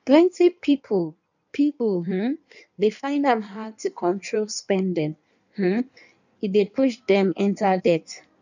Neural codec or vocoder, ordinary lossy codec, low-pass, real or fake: codec, 16 kHz in and 24 kHz out, 1.1 kbps, FireRedTTS-2 codec; none; 7.2 kHz; fake